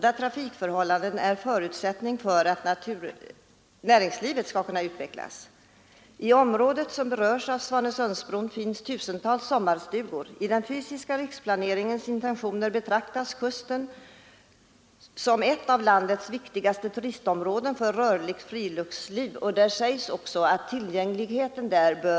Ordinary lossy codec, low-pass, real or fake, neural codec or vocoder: none; none; real; none